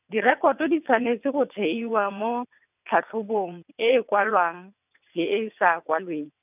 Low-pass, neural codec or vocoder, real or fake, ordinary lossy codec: 3.6 kHz; vocoder, 22.05 kHz, 80 mel bands, WaveNeXt; fake; none